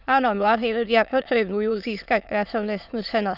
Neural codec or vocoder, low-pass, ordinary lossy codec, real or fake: autoencoder, 22.05 kHz, a latent of 192 numbers a frame, VITS, trained on many speakers; 5.4 kHz; none; fake